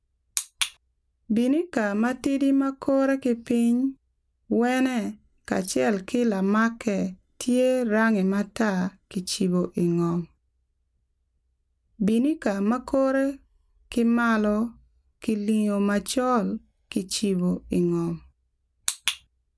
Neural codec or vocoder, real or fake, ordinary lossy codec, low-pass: none; real; none; none